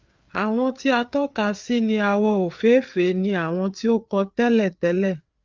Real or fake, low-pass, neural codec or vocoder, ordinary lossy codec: fake; 7.2 kHz; codec, 16 kHz, 4 kbps, FreqCodec, larger model; Opus, 24 kbps